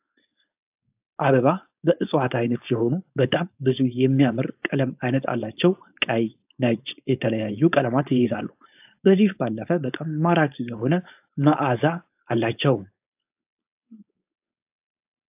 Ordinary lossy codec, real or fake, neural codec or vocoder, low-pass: AAC, 32 kbps; fake; codec, 16 kHz, 4.8 kbps, FACodec; 3.6 kHz